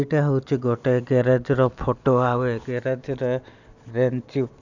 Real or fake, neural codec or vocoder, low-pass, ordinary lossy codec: real; none; 7.2 kHz; none